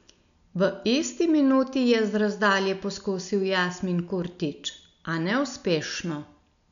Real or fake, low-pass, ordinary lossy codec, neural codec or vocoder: real; 7.2 kHz; none; none